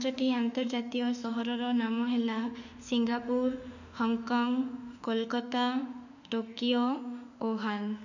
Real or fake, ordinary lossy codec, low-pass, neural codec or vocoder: fake; none; 7.2 kHz; autoencoder, 48 kHz, 32 numbers a frame, DAC-VAE, trained on Japanese speech